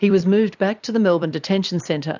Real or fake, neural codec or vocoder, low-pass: fake; vocoder, 44.1 kHz, 128 mel bands every 256 samples, BigVGAN v2; 7.2 kHz